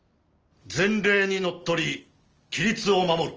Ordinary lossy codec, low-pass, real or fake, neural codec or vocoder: Opus, 24 kbps; 7.2 kHz; real; none